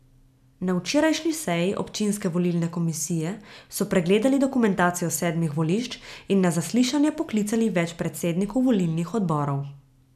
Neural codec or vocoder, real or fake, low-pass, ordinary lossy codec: none; real; 14.4 kHz; none